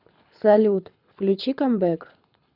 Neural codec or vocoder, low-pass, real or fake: codec, 24 kHz, 6 kbps, HILCodec; 5.4 kHz; fake